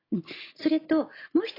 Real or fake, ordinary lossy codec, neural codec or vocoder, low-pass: real; AAC, 32 kbps; none; 5.4 kHz